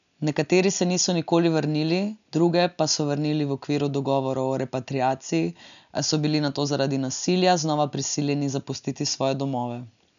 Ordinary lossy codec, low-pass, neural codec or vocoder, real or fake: MP3, 96 kbps; 7.2 kHz; none; real